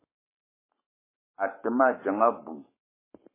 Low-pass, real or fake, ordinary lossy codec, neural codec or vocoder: 3.6 kHz; fake; MP3, 16 kbps; codec, 44.1 kHz, 7.8 kbps, Pupu-Codec